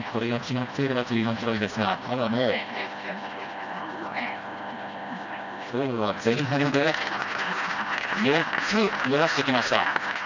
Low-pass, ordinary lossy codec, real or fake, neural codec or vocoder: 7.2 kHz; none; fake; codec, 16 kHz, 1 kbps, FreqCodec, smaller model